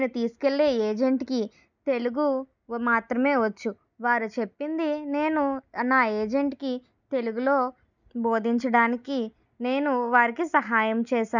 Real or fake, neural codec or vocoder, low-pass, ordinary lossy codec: real; none; 7.2 kHz; none